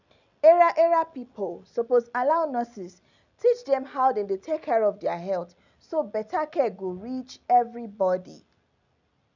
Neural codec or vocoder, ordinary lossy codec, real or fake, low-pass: none; none; real; 7.2 kHz